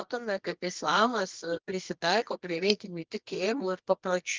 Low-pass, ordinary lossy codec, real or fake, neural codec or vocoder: 7.2 kHz; Opus, 24 kbps; fake; codec, 24 kHz, 0.9 kbps, WavTokenizer, medium music audio release